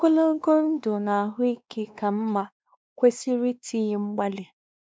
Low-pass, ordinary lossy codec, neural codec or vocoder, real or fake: none; none; codec, 16 kHz, 2 kbps, X-Codec, WavLM features, trained on Multilingual LibriSpeech; fake